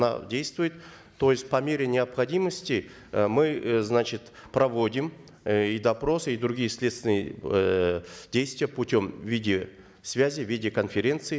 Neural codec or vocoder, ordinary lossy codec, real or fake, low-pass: none; none; real; none